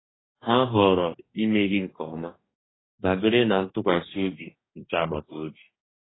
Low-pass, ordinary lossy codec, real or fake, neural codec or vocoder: 7.2 kHz; AAC, 16 kbps; fake; codec, 44.1 kHz, 2.6 kbps, DAC